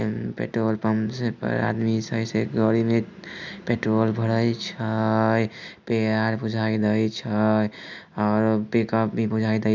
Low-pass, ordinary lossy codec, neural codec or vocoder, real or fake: none; none; none; real